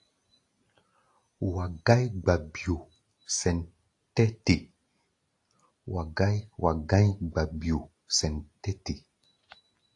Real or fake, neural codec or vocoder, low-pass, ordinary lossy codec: real; none; 10.8 kHz; AAC, 48 kbps